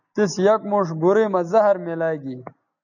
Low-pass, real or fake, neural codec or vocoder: 7.2 kHz; real; none